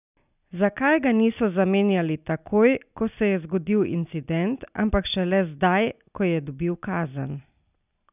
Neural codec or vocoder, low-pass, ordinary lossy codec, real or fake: none; 3.6 kHz; none; real